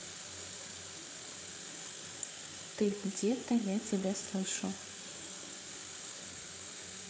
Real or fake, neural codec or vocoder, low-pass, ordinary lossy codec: fake; codec, 16 kHz, 8 kbps, FreqCodec, larger model; none; none